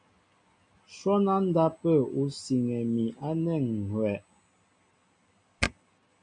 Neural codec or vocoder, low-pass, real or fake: none; 9.9 kHz; real